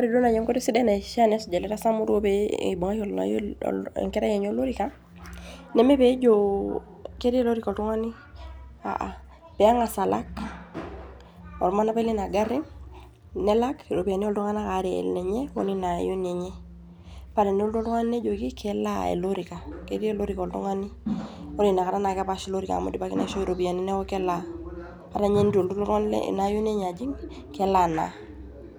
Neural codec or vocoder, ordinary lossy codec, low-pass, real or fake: none; none; none; real